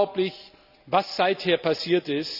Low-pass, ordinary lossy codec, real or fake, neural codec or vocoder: 5.4 kHz; none; real; none